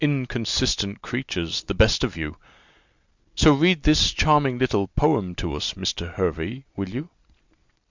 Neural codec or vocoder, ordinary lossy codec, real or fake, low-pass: none; Opus, 64 kbps; real; 7.2 kHz